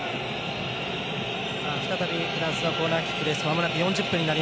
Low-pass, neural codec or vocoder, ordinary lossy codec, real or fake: none; none; none; real